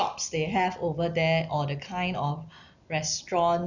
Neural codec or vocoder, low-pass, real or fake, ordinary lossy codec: none; 7.2 kHz; real; none